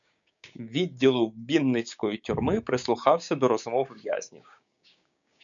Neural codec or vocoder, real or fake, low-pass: codec, 16 kHz, 6 kbps, DAC; fake; 7.2 kHz